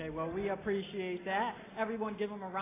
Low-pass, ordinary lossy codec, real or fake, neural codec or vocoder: 3.6 kHz; AAC, 16 kbps; real; none